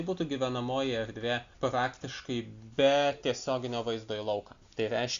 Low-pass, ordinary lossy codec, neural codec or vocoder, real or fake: 7.2 kHz; Opus, 64 kbps; none; real